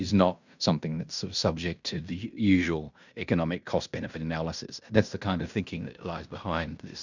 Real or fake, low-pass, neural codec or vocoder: fake; 7.2 kHz; codec, 16 kHz in and 24 kHz out, 0.9 kbps, LongCat-Audio-Codec, fine tuned four codebook decoder